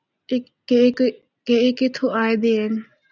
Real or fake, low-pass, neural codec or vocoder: real; 7.2 kHz; none